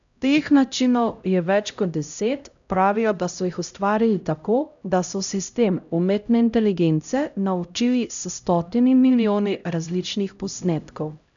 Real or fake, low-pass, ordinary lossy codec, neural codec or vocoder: fake; 7.2 kHz; none; codec, 16 kHz, 0.5 kbps, X-Codec, HuBERT features, trained on LibriSpeech